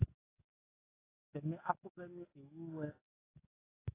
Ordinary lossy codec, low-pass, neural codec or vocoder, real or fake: AAC, 16 kbps; 3.6 kHz; codec, 44.1 kHz, 7.8 kbps, DAC; fake